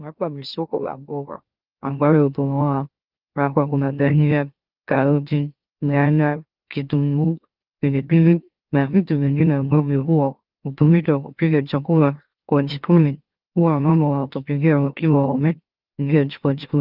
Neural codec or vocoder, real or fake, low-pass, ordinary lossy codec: autoencoder, 44.1 kHz, a latent of 192 numbers a frame, MeloTTS; fake; 5.4 kHz; Opus, 32 kbps